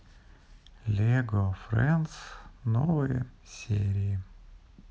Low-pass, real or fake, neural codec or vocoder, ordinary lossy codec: none; real; none; none